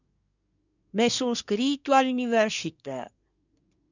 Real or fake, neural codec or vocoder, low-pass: fake; codec, 24 kHz, 1 kbps, SNAC; 7.2 kHz